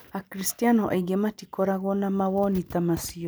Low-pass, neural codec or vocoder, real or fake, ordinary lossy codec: none; none; real; none